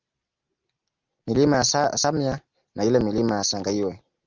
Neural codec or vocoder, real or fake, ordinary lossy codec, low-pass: none; real; Opus, 24 kbps; 7.2 kHz